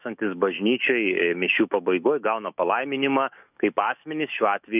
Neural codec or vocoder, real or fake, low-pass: autoencoder, 48 kHz, 128 numbers a frame, DAC-VAE, trained on Japanese speech; fake; 3.6 kHz